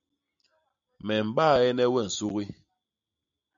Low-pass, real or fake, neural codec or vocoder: 7.2 kHz; real; none